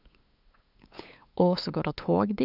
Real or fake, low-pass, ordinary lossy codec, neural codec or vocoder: fake; 5.4 kHz; none; codec, 16 kHz, 8 kbps, FunCodec, trained on LibriTTS, 25 frames a second